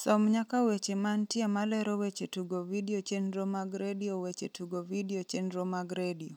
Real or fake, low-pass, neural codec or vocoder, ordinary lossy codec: real; 19.8 kHz; none; none